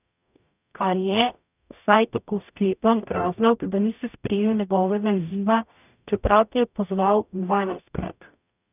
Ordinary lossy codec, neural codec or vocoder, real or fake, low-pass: none; codec, 44.1 kHz, 0.9 kbps, DAC; fake; 3.6 kHz